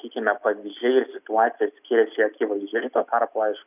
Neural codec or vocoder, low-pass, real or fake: none; 3.6 kHz; real